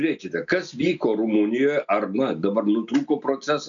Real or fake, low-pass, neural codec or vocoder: real; 7.2 kHz; none